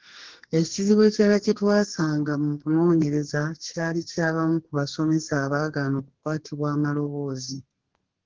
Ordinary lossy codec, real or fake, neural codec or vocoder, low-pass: Opus, 16 kbps; fake; codec, 32 kHz, 1.9 kbps, SNAC; 7.2 kHz